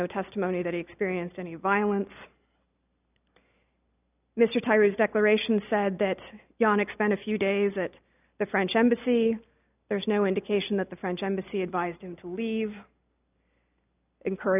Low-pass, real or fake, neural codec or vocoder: 3.6 kHz; real; none